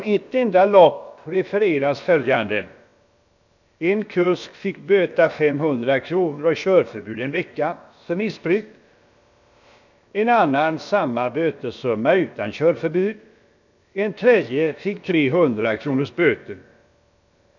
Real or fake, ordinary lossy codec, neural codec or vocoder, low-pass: fake; none; codec, 16 kHz, about 1 kbps, DyCAST, with the encoder's durations; 7.2 kHz